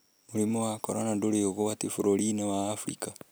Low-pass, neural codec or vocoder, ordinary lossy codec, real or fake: none; none; none; real